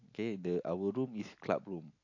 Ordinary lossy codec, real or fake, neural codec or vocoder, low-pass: AAC, 48 kbps; real; none; 7.2 kHz